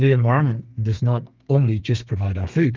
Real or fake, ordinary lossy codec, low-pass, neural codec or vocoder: fake; Opus, 24 kbps; 7.2 kHz; codec, 32 kHz, 1.9 kbps, SNAC